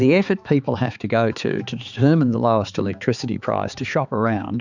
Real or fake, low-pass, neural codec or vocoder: fake; 7.2 kHz; codec, 16 kHz, 4 kbps, X-Codec, HuBERT features, trained on balanced general audio